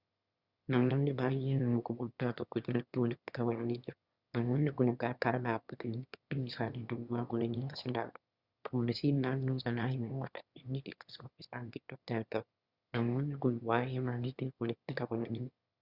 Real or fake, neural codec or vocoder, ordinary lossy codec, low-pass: fake; autoencoder, 22.05 kHz, a latent of 192 numbers a frame, VITS, trained on one speaker; Opus, 64 kbps; 5.4 kHz